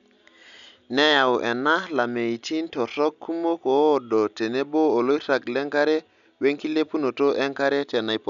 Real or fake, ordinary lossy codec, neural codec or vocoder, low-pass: real; none; none; 7.2 kHz